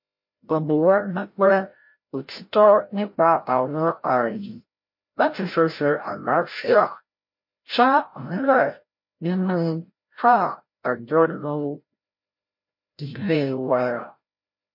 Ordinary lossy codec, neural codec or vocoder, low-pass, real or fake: MP3, 32 kbps; codec, 16 kHz, 0.5 kbps, FreqCodec, larger model; 5.4 kHz; fake